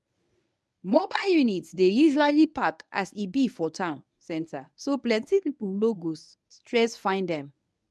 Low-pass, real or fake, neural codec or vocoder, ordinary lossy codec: none; fake; codec, 24 kHz, 0.9 kbps, WavTokenizer, medium speech release version 1; none